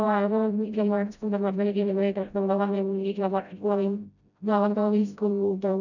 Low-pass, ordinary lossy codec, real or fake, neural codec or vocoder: 7.2 kHz; none; fake; codec, 16 kHz, 0.5 kbps, FreqCodec, smaller model